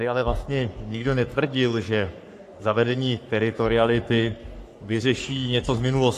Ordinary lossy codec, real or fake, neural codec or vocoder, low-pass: AAC, 64 kbps; fake; codec, 44.1 kHz, 3.4 kbps, Pupu-Codec; 14.4 kHz